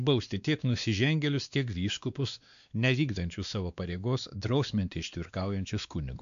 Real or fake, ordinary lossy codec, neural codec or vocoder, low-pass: fake; AAC, 96 kbps; codec, 16 kHz, 2 kbps, X-Codec, WavLM features, trained on Multilingual LibriSpeech; 7.2 kHz